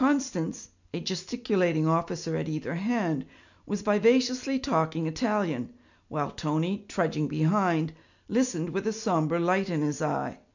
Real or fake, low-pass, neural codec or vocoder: real; 7.2 kHz; none